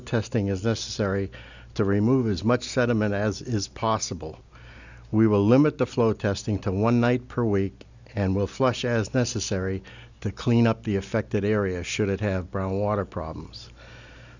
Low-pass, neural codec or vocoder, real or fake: 7.2 kHz; none; real